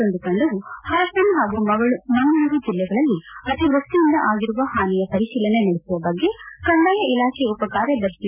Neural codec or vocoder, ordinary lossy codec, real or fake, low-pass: none; none; real; 3.6 kHz